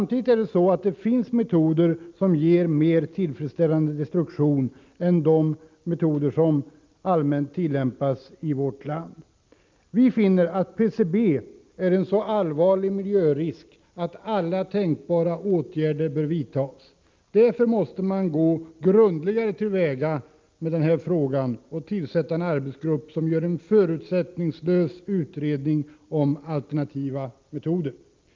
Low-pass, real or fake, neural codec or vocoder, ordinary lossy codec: 7.2 kHz; real; none; Opus, 24 kbps